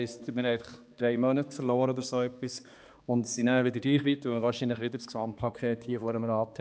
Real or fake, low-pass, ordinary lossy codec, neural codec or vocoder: fake; none; none; codec, 16 kHz, 2 kbps, X-Codec, HuBERT features, trained on balanced general audio